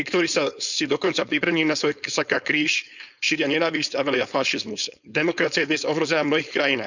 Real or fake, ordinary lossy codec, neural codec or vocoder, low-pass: fake; none; codec, 16 kHz, 4.8 kbps, FACodec; 7.2 kHz